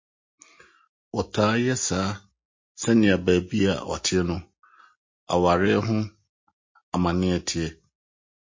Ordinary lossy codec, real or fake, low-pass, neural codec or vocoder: MP3, 32 kbps; real; 7.2 kHz; none